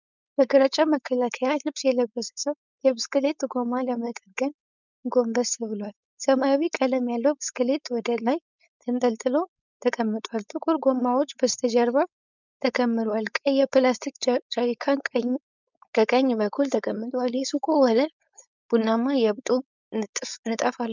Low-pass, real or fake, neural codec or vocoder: 7.2 kHz; fake; codec, 16 kHz, 4.8 kbps, FACodec